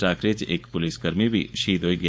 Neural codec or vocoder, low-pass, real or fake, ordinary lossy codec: codec, 16 kHz, 4.8 kbps, FACodec; none; fake; none